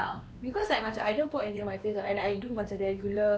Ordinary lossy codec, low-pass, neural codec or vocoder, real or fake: none; none; codec, 16 kHz, 2 kbps, X-Codec, WavLM features, trained on Multilingual LibriSpeech; fake